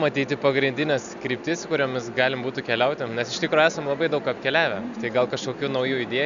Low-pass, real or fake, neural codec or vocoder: 7.2 kHz; real; none